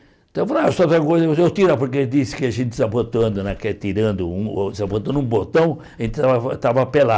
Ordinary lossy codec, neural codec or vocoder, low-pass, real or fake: none; none; none; real